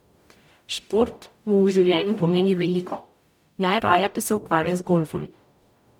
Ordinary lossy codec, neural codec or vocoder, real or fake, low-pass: none; codec, 44.1 kHz, 0.9 kbps, DAC; fake; 19.8 kHz